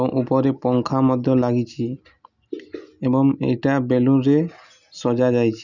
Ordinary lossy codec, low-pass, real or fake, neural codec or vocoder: none; 7.2 kHz; real; none